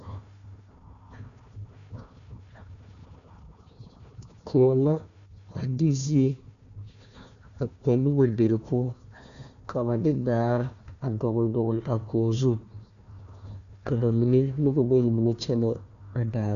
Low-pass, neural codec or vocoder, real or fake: 7.2 kHz; codec, 16 kHz, 1 kbps, FunCodec, trained on Chinese and English, 50 frames a second; fake